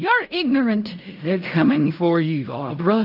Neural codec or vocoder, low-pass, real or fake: codec, 16 kHz in and 24 kHz out, 0.4 kbps, LongCat-Audio-Codec, fine tuned four codebook decoder; 5.4 kHz; fake